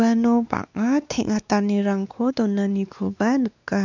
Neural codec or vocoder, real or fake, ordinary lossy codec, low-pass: codec, 16 kHz, 2 kbps, X-Codec, WavLM features, trained on Multilingual LibriSpeech; fake; none; 7.2 kHz